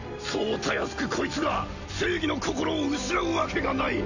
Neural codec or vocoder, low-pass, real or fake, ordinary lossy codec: none; 7.2 kHz; real; none